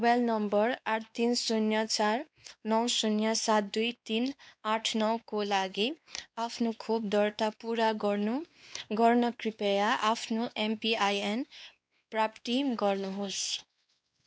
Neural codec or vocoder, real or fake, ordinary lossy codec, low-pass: codec, 16 kHz, 2 kbps, X-Codec, WavLM features, trained on Multilingual LibriSpeech; fake; none; none